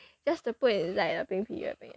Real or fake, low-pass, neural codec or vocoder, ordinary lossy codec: real; none; none; none